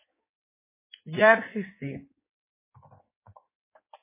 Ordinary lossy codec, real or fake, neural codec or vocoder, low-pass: MP3, 16 kbps; fake; codec, 16 kHz in and 24 kHz out, 1.1 kbps, FireRedTTS-2 codec; 3.6 kHz